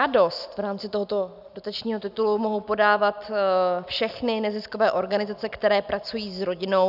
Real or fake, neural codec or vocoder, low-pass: real; none; 5.4 kHz